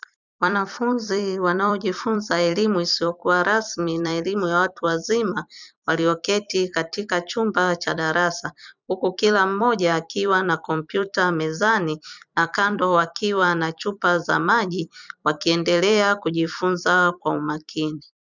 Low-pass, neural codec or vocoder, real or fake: 7.2 kHz; vocoder, 44.1 kHz, 128 mel bands every 256 samples, BigVGAN v2; fake